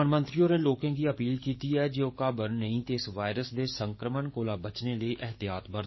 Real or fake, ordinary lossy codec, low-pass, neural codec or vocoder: fake; MP3, 24 kbps; 7.2 kHz; codec, 44.1 kHz, 7.8 kbps, DAC